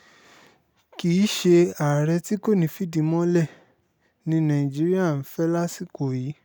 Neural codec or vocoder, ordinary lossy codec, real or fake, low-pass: none; none; real; none